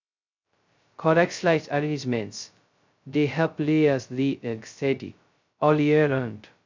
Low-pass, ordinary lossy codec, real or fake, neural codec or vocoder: 7.2 kHz; MP3, 64 kbps; fake; codec, 16 kHz, 0.2 kbps, FocalCodec